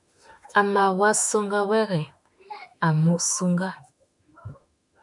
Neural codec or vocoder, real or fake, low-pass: autoencoder, 48 kHz, 32 numbers a frame, DAC-VAE, trained on Japanese speech; fake; 10.8 kHz